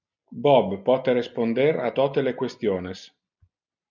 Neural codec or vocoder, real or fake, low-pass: none; real; 7.2 kHz